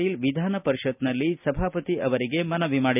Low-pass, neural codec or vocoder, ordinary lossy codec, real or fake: 3.6 kHz; none; none; real